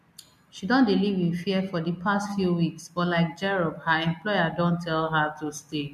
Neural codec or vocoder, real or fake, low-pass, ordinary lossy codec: none; real; 14.4 kHz; MP3, 64 kbps